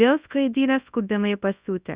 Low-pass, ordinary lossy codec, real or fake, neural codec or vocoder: 3.6 kHz; Opus, 64 kbps; fake; codec, 24 kHz, 0.9 kbps, WavTokenizer, large speech release